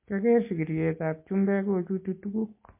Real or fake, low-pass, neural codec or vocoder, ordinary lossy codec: real; 3.6 kHz; none; MP3, 24 kbps